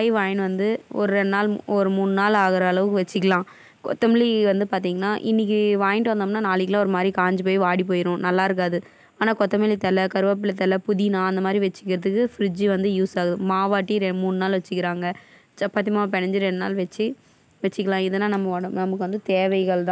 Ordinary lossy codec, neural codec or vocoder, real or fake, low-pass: none; none; real; none